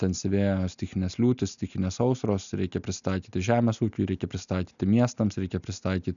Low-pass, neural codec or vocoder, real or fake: 7.2 kHz; none; real